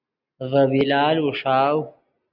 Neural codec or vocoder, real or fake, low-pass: none; real; 5.4 kHz